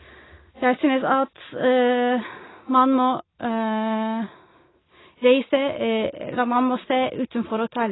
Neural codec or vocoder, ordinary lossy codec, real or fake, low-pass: none; AAC, 16 kbps; real; 7.2 kHz